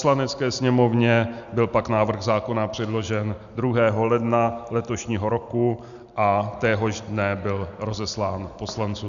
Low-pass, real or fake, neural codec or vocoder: 7.2 kHz; real; none